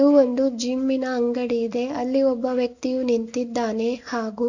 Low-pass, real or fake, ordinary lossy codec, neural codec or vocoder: 7.2 kHz; real; none; none